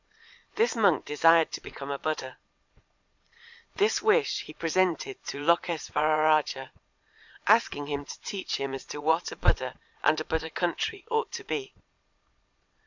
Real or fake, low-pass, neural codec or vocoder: fake; 7.2 kHz; vocoder, 22.05 kHz, 80 mel bands, Vocos